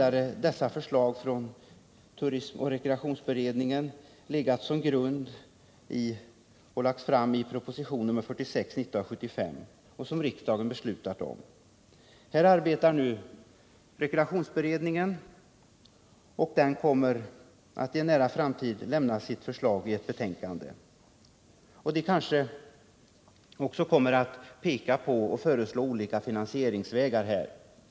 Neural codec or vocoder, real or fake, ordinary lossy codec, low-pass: none; real; none; none